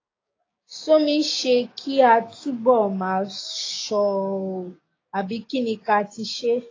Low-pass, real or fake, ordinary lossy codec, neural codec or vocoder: 7.2 kHz; fake; AAC, 32 kbps; codec, 16 kHz, 6 kbps, DAC